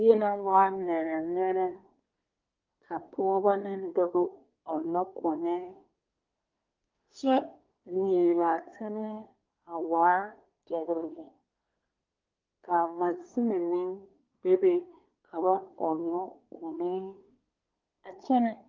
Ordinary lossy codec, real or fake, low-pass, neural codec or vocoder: Opus, 32 kbps; fake; 7.2 kHz; codec, 24 kHz, 1 kbps, SNAC